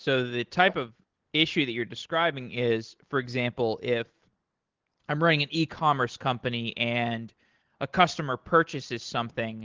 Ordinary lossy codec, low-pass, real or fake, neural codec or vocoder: Opus, 16 kbps; 7.2 kHz; real; none